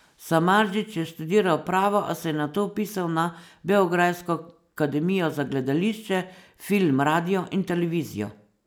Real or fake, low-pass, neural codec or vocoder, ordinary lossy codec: real; none; none; none